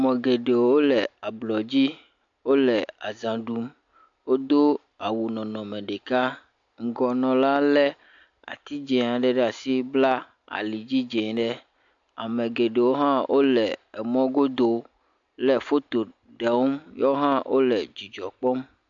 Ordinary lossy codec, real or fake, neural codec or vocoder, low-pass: AAC, 64 kbps; real; none; 7.2 kHz